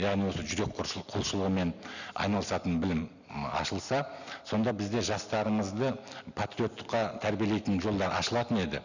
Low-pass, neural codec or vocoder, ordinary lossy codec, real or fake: 7.2 kHz; none; none; real